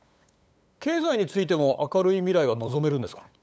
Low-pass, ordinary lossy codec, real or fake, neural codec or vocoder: none; none; fake; codec, 16 kHz, 8 kbps, FunCodec, trained on LibriTTS, 25 frames a second